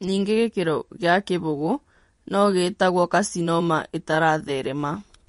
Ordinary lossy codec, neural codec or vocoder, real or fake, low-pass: MP3, 48 kbps; vocoder, 24 kHz, 100 mel bands, Vocos; fake; 10.8 kHz